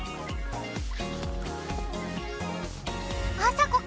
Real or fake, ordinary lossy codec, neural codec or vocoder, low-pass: real; none; none; none